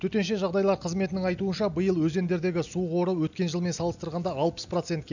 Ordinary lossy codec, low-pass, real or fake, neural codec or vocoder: none; 7.2 kHz; real; none